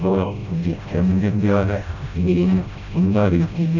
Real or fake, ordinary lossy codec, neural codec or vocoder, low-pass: fake; none; codec, 16 kHz, 0.5 kbps, FreqCodec, smaller model; 7.2 kHz